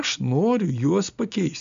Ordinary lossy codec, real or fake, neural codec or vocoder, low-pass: MP3, 64 kbps; real; none; 7.2 kHz